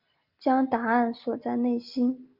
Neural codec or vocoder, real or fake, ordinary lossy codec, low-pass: none; real; Opus, 24 kbps; 5.4 kHz